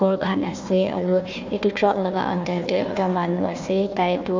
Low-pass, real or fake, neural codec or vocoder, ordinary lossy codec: 7.2 kHz; fake; codec, 16 kHz, 1 kbps, FunCodec, trained on Chinese and English, 50 frames a second; MP3, 64 kbps